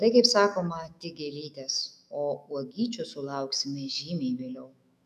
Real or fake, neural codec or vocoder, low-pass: fake; autoencoder, 48 kHz, 128 numbers a frame, DAC-VAE, trained on Japanese speech; 14.4 kHz